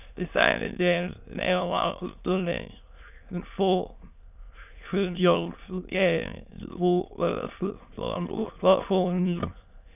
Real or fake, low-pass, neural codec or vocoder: fake; 3.6 kHz; autoencoder, 22.05 kHz, a latent of 192 numbers a frame, VITS, trained on many speakers